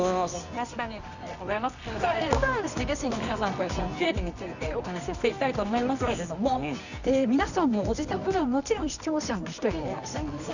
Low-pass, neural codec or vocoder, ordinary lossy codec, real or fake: 7.2 kHz; codec, 24 kHz, 0.9 kbps, WavTokenizer, medium music audio release; none; fake